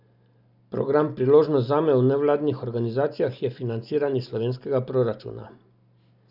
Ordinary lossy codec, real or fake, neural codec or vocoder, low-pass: none; real; none; 5.4 kHz